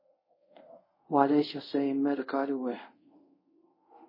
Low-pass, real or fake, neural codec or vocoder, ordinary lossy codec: 5.4 kHz; fake; codec, 24 kHz, 0.5 kbps, DualCodec; MP3, 24 kbps